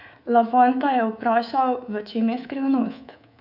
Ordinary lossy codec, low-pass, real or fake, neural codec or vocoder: none; 5.4 kHz; fake; codec, 24 kHz, 3.1 kbps, DualCodec